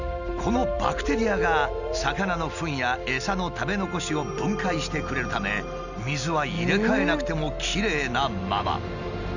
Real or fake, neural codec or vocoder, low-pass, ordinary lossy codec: real; none; 7.2 kHz; none